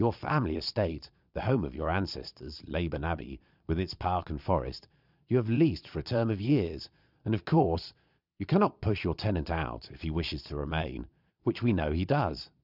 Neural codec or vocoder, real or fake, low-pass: vocoder, 22.05 kHz, 80 mel bands, Vocos; fake; 5.4 kHz